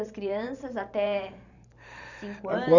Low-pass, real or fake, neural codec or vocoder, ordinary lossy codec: 7.2 kHz; fake; vocoder, 44.1 kHz, 128 mel bands every 512 samples, BigVGAN v2; none